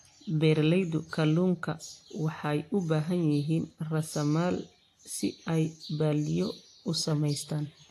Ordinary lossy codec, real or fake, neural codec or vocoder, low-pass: AAC, 64 kbps; real; none; 14.4 kHz